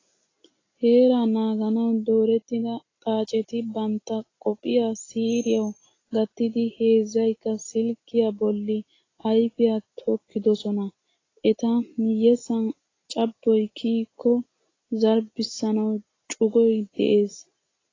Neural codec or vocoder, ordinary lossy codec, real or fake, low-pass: none; AAC, 32 kbps; real; 7.2 kHz